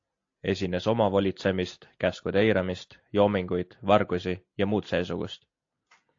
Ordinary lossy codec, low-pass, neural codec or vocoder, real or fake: AAC, 48 kbps; 7.2 kHz; none; real